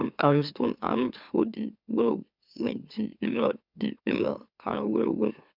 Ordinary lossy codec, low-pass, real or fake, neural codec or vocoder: none; 5.4 kHz; fake; autoencoder, 44.1 kHz, a latent of 192 numbers a frame, MeloTTS